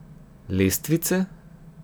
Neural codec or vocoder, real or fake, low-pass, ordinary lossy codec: none; real; none; none